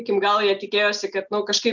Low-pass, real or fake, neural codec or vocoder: 7.2 kHz; real; none